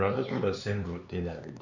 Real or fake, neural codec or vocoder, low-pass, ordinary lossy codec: fake; codec, 16 kHz, 4 kbps, X-Codec, HuBERT features, trained on LibriSpeech; 7.2 kHz; none